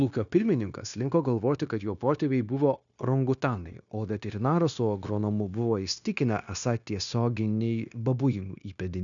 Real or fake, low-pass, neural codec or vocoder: fake; 7.2 kHz; codec, 16 kHz, 0.9 kbps, LongCat-Audio-Codec